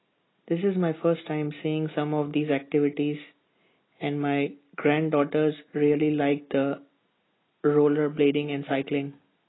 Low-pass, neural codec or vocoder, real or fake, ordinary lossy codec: 7.2 kHz; none; real; AAC, 16 kbps